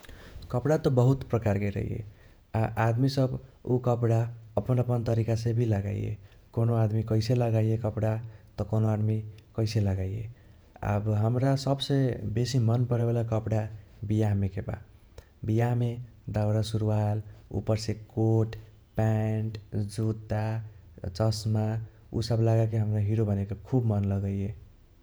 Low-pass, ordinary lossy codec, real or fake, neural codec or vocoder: none; none; fake; vocoder, 48 kHz, 128 mel bands, Vocos